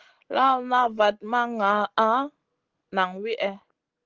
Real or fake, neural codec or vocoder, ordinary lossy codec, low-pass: real; none; Opus, 16 kbps; 7.2 kHz